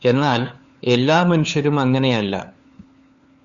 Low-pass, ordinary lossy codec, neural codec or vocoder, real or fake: 7.2 kHz; Opus, 64 kbps; codec, 16 kHz, 4 kbps, FunCodec, trained on LibriTTS, 50 frames a second; fake